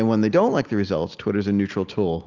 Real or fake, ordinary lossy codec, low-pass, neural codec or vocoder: real; Opus, 24 kbps; 7.2 kHz; none